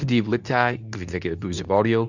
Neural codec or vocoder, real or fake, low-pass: codec, 24 kHz, 0.9 kbps, WavTokenizer, medium speech release version 2; fake; 7.2 kHz